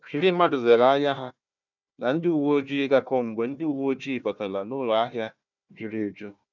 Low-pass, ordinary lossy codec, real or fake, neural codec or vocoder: 7.2 kHz; none; fake; codec, 16 kHz, 1 kbps, FunCodec, trained on Chinese and English, 50 frames a second